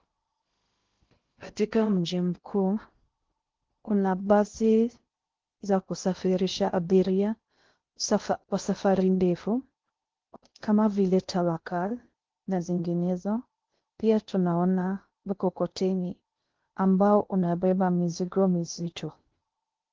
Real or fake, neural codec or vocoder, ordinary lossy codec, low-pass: fake; codec, 16 kHz in and 24 kHz out, 0.6 kbps, FocalCodec, streaming, 2048 codes; Opus, 16 kbps; 7.2 kHz